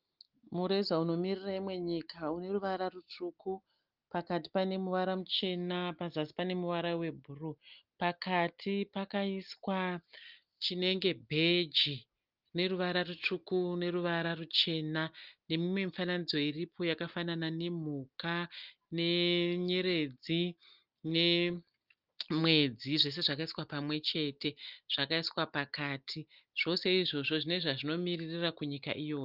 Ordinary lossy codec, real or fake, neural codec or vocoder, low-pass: Opus, 32 kbps; real; none; 5.4 kHz